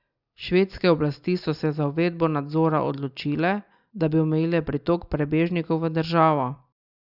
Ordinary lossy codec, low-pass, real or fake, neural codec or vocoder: Opus, 64 kbps; 5.4 kHz; real; none